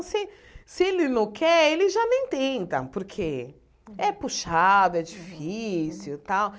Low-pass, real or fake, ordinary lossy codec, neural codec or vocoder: none; real; none; none